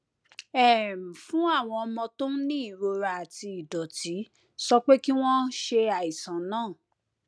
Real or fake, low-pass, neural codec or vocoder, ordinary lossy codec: real; none; none; none